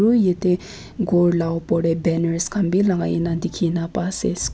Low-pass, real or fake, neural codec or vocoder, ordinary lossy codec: none; real; none; none